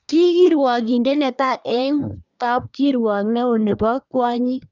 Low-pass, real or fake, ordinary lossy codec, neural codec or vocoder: 7.2 kHz; fake; none; codec, 24 kHz, 1 kbps, SNAC